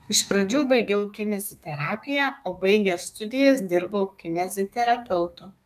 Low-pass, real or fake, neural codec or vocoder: 14.4 kHz; fake; codec, 32 kHz, 1.9 kbps, SNAC